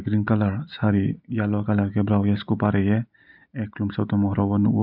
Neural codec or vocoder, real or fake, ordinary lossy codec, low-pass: none; real; none; 5.4 kHz